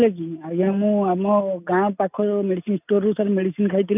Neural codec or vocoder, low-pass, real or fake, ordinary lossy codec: none; 3.6 kHz; real; none